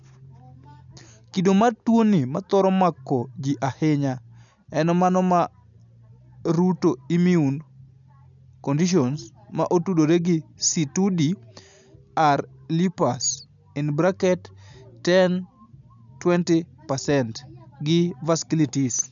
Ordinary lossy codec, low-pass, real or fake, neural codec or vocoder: none; 7.2 kHz; real; none